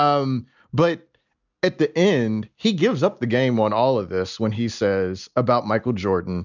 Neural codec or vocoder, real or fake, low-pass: none; real; 7.2 kHz